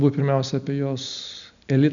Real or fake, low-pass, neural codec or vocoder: real; 7.2 kHz; none